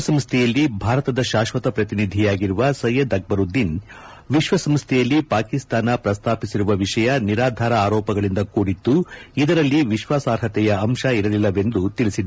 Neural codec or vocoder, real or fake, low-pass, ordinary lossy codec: none; real; none; none